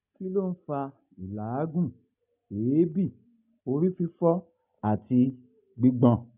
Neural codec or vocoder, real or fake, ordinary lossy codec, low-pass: none; real; none; 3.6 kHz